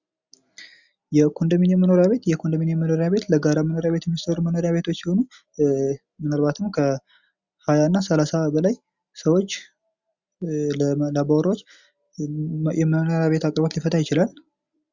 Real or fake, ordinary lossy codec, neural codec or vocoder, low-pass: real; Opus, 64 kbps; none; 7.2 kHz